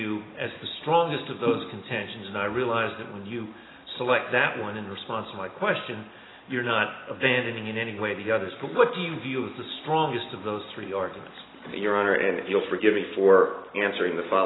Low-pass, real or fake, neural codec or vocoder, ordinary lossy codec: 7.2 kHz; real; none; AAC, 16 kbps